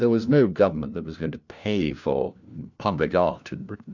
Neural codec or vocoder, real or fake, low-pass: codec, 16 kHz, 1 kbps, FunCodec, trained on LibriTTS, 50 frames a second; fake; 7.2 kHz